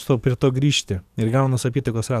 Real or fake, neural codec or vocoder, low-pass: fake; codec, 44.1 kHz, 7.8 kbps, DAC; 14.4 kHz